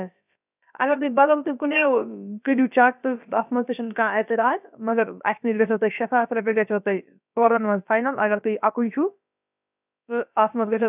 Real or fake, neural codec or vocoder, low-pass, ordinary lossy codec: fake; codec, 16 kHz, about 1 kbps, DyCAST, with the encoder's durations; 3.6 kHz; none